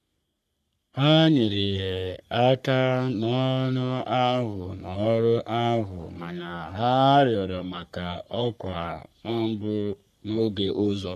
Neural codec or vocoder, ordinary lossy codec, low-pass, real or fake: codec, 44.1 kHz, 3.4 kbps, Pupu-Codec; none; 14.4 kHz; fake